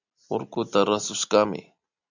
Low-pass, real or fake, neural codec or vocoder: 7.2 kHz; real; none